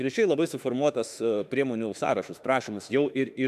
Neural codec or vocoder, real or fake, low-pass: autoencoder, 48 kHz, 32 numbers a frame, DAC-VAE, trained on Japanese speech; fake; 14.4 kHz